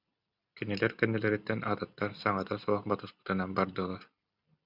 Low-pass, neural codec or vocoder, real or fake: 5.4 kHz; none; real